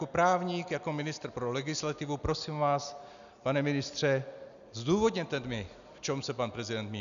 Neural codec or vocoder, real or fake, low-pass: none; real; 7.2 kHz